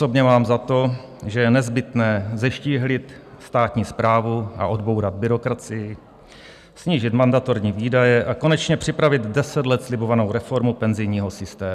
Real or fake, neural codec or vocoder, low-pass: real; none; 14.4 kHz